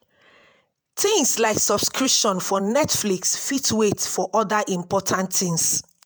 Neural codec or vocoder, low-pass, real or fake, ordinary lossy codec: vocoder, 48 kHz, 128 mel bands, Vocos; none; fake; none